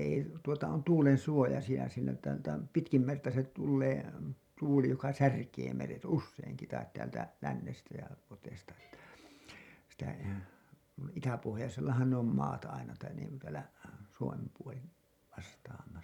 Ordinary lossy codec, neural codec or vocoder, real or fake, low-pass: none; none; real; 19.8 kHz